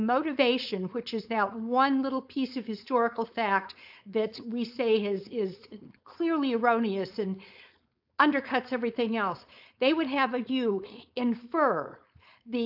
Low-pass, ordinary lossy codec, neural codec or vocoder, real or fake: 5.4 kHz; AAC, 48 kbps; codec, 16 kHz, 4.8 kbps, FACodec; fake